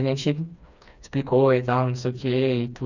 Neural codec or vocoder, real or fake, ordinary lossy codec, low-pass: codec, 16 kHz, 2 kbps, FreqCodec, smaller model; fake; none; 7.2 kHz